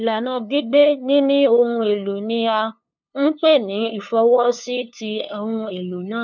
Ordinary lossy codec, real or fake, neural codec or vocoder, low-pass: none; fake; codec, 16 kHz, 2 kbps, FunCodec, trained on LibriTTS, 25 frames a second; 7.2 kHz